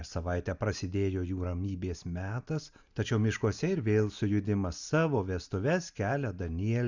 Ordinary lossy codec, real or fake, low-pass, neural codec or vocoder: Opus, 64 kbps; real; 7.2 kHz; none